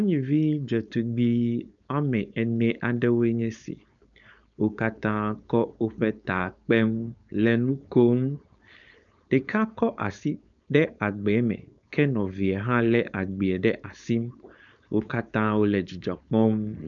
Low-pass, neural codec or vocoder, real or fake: 7.2 kHz; codec, 16 kHz, 4.8 kbps, FACodec; fake